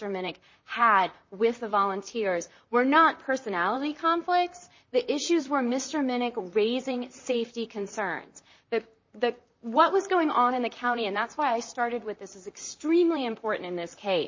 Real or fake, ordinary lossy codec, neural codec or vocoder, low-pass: fake; MP3, 32 kbps; vocoder, 44.1 kHz, 128 mel bands, Pupu-Vocoder; 7.2 kHz